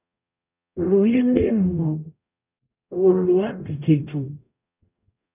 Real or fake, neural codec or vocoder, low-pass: fake; codec, 44.1 kHz, 0.9 kbps, DAC; 3.6 kHz